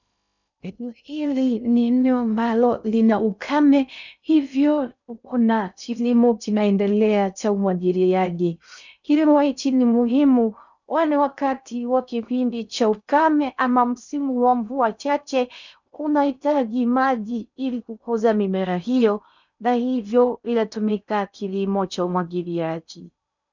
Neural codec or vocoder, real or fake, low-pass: codec, 16 kHz in and 24 kHz out, 0.6 kbps, FocalCodec, streaming, 2048 codes; fake; 7.2 kHz